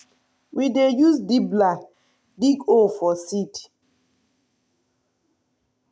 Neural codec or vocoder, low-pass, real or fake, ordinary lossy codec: none; none; real; none